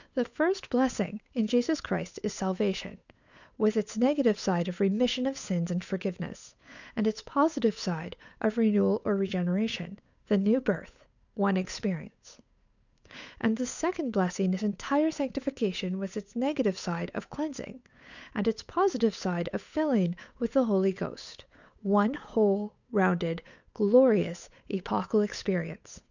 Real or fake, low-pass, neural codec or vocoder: fake; 7.2 kHz; codec, 16 kHz, 8 kbps, FunCodec, trained on Chinese and English, 25 frames a second